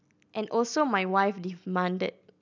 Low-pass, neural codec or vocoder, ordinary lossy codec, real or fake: 7.2 kHz; none; none; real